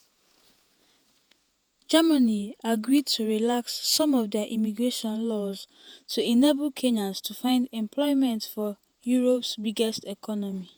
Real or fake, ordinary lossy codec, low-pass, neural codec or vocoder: fake; none; none; vocoder, 48 kHz, 128 mel bands, Vocos